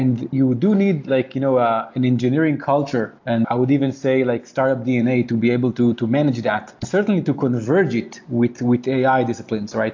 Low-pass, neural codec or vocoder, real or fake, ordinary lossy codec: 7.2 kHz; none; real; AAC, 48 kbps